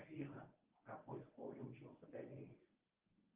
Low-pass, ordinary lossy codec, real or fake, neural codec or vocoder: 3.6 kHz; Opus, 24 kbps; fake; codec, 24 kHz, 0.9 kbps, WavTokenizer, medium speech release version 1